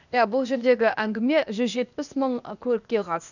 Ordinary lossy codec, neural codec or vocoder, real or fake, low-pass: none; codec, 16 kHz, 0.8 kbps, ZipCodec; fake; 7.2 kHz